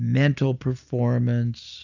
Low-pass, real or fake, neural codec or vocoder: 7.2 kHz; real; none